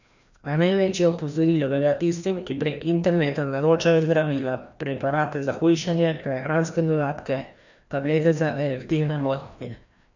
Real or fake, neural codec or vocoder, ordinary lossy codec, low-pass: fake; codec, 16 kHz, 1 kbps, FreqCodec, larger model; none; 7.2 kHz